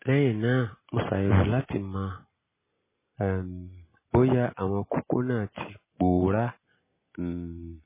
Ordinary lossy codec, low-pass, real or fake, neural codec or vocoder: MP3, 16 kbps; 3.6 kHz; real; none